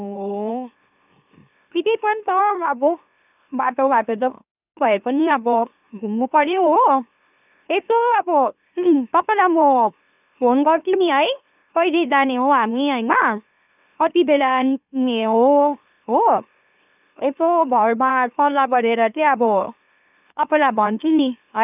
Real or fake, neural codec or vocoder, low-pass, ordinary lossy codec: fake; autoencoder, 44.1 kHz, a latent of 192 numbers a frame, MeloTTS; 3.6 kHz; none